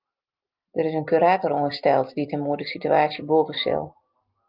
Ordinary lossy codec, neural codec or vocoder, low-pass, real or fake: Opus, 32 kbps; none; 5.4 kHz; real